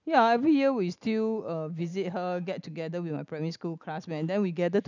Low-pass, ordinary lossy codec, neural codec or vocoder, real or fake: 7.2 kHz; none; none; real